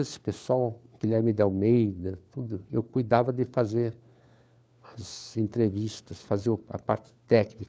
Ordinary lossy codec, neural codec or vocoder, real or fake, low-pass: none; codec, 16 kHz, 4 kbps, FunCodec, trained on LibriTTS, 50 frames a second; fake; none